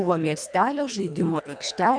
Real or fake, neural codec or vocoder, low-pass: fake; codec, 24 kHz, 1.5 kbps, HILCodec; 9.9 kHz